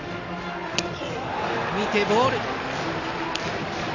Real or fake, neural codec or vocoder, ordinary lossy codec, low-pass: real; none; none; 7.2 kHz